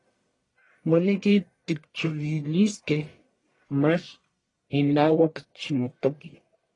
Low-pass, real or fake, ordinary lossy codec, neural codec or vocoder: 10.8 kHz; fake; AAC, 32 kbps; codec, 44.1 kHz, 1.7 kbps, Pupu-Codec